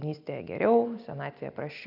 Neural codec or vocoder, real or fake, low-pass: none; real; 5.4 kHz